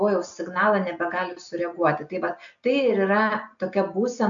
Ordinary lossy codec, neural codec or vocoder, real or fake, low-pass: MP3, 64 kbps; none; real; 7.2 kHz